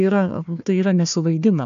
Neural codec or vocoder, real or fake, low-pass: codec, 16 kHz, 1 kbps, FunCodec, trained on Chinese and English, 50 frames a second; fake; 7.2 kHz